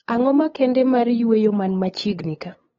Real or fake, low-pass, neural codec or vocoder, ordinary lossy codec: fake; 7.2 kHz; codec, 16 kHz, 8 kbps, FunCodec, trained on LibriTTS, 25 frames a second; AAC, 24 kbps